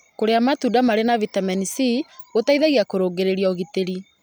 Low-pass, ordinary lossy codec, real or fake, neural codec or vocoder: none; none; real; none